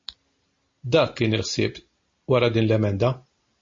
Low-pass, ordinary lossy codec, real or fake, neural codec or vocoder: 7.2 kHz; MP3, 32 kbps; real; none